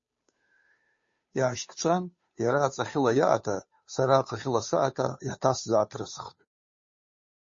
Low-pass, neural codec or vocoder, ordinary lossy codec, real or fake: 7.2 kHz; codec, 16 kHz, 2 kbps, FunCodec, trained on Chinese and English, 25 frames a second; MP3, 32 kbps; fake